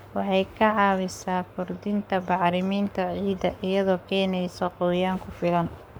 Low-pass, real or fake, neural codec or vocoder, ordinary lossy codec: none; fake; codec, 44.1 kHz, 7.8 kbps, Pupu-Codec; none